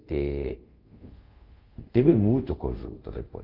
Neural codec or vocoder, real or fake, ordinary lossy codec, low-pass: codec, 24 kHz, 0.5 kbps, DualCodec; fake; Opus, 24 kbps; 5.4 kHz